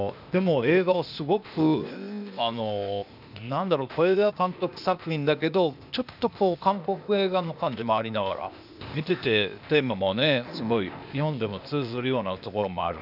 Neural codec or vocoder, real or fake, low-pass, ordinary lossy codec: codec, 16 kHz, 0.8 kbps, ZipCodec; fake; 5.4 kHz; none